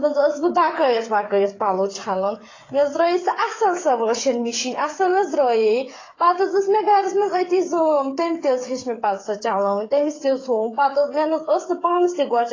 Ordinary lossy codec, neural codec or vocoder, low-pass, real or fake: AAC, 32 kbps; codec, 16 kHz, 8 kbps, FreqCodec, smaller model; 7.2 kHz; fake